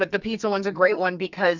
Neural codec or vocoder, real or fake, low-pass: codec, 44.1 kHz, 2.6 kbps, SNAC; fake; 7.2 kHz